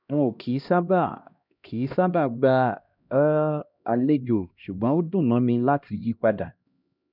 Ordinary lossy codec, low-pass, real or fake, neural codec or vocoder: none; 5.4 kHz; fake; codec, 16 kHz, 1 kbps, X-Codec, HuBERT features, trained on LibriSpeech